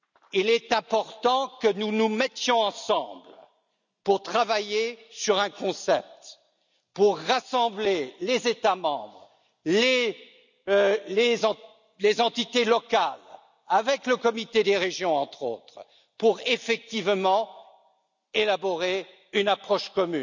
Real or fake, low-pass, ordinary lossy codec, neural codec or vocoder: real; 7.2 kHz; none; none